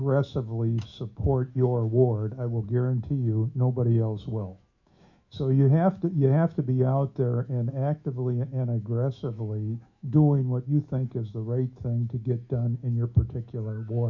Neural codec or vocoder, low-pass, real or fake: autoencoder, 48 kHz, 128 numbers a frame, DAC-VAE, trained on Japanese speech; 7.2 kHz; fake